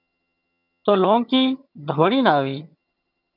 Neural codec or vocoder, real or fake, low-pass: vocoder, 22.05 kHz, 80 mel bands, HiFi-GAN; fake; 5.4 kHz